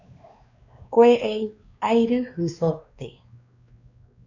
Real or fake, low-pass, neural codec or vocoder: fake; 7.2 kHz; codec, 16 kHz, 2 kbps, X-Codec, WavLM features, trained on Multilingual LibriSpeech